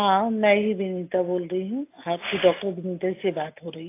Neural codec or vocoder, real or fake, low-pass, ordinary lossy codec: none; real; 3.6 kHz; AAC, 24 kbps